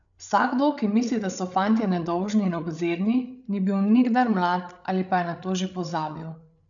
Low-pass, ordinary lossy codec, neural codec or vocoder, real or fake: 7.2 kHz; none; codec, 16 kHz, 8 kbps, FreqCodec, larger model; fake